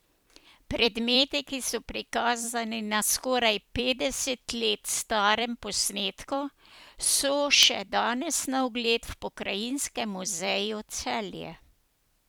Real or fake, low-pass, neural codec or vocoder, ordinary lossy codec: fake; none; vocoder, 44.1 kHz, 128 mel bands every 256 samples, BigVGAN v2; none